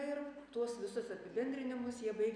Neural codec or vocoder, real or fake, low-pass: none; real; 9.9 kHz